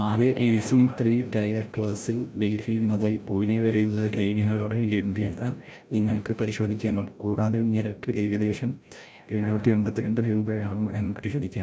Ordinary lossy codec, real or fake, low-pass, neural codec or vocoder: none; fake; none; codec, 16 kHz, 0.5 kbps, FreqCodec, larger model